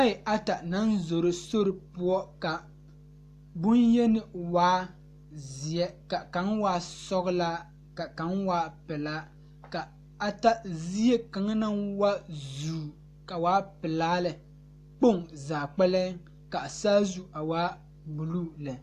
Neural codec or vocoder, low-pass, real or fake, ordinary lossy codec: none; 14.4 kHz; real; AAC, 64 kbps